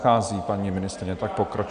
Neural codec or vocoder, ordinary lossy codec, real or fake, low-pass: none; Opus, 64 kbps; real; 9.9 kHz